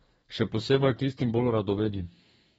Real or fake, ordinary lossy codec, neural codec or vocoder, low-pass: fake; AAC, 24 kbps; codec, 32 kHz, 1.9 kbps, SNAC; 14.4 kHz